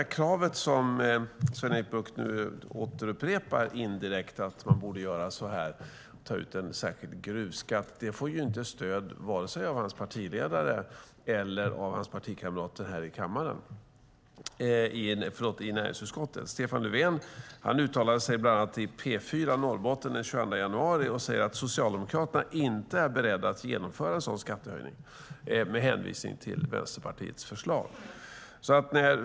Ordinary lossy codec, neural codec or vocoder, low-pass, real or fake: none; none; none; real